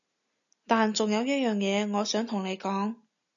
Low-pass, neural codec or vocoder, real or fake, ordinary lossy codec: 7.2 kHz; none; real; MP3, 64 kbps